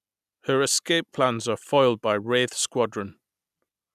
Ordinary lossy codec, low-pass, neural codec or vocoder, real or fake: none; 14.4 kHz; none; real